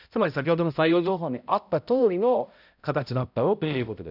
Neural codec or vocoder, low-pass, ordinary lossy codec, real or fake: codec, 16 kHz, 0.5 kbps, X-Codec, HuBERT features, trained on balanced general audio; 5.4 kHz; none; fake